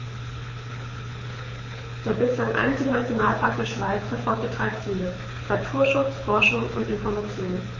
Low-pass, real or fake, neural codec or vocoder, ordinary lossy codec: 7.2 kHz; fake; codec, 24 kHz, 6 kbps, HILCodec; MP3, 32 kbps